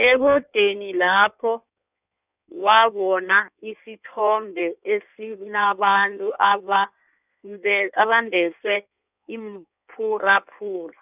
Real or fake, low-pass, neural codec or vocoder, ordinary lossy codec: fake; 3.6 kHz; codec, 16 kHz in and 24 kHz out, 1.1 kbps, FireRedTTS-2 codec; none